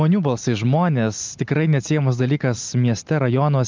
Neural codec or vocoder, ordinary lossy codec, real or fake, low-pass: none; Opus, 24 kbps; real; 7.2 kHz